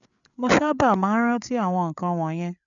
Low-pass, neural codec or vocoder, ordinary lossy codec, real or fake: 7.2 kHz; codec, 16 kHz, 8 kbps, FreqCodec, larger model; none; fake